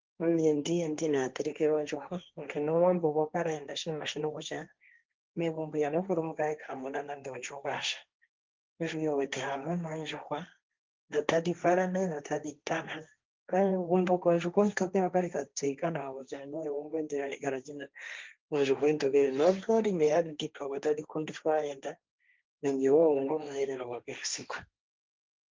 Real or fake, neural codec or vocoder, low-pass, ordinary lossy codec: fake; codec, 16 kHz, 1.1 kbps, Voila-Tokenizer; 7.2 kHz; Opus, 32 kbps